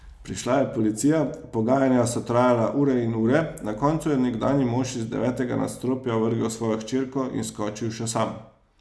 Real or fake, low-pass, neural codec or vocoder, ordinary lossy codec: real; none; none; none